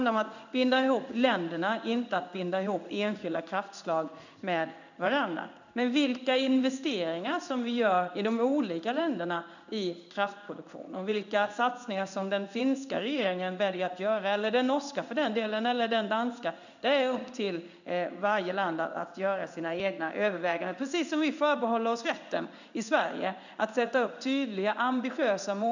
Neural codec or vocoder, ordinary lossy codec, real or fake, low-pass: codec, 16 kHz in and 24 kHz out, 1 kbps, XY-Tokenizer; none; fake; 7.2 kHz